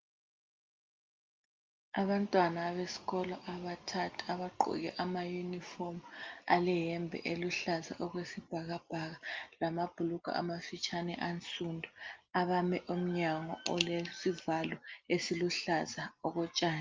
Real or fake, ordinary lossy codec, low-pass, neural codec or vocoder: real; Opus, 24 kbps; 7.2 kHz; none